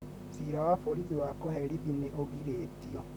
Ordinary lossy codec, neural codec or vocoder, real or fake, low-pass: none; vocoder, 44.1 kHz, 128 mel bands, Pupu-Vocoder; fake; none